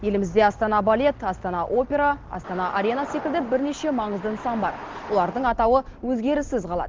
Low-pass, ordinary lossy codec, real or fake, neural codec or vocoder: 7.2 kHz; Opus, 16 kbps; real; none